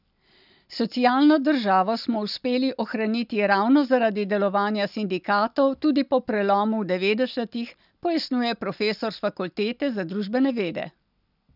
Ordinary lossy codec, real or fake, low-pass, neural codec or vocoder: none; real; 5.4 kHz; none